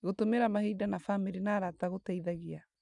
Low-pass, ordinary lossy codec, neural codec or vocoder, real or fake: 10.8 kHz; none; none; real